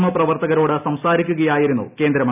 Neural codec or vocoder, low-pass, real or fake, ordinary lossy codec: none; 3.6 kHz; real; none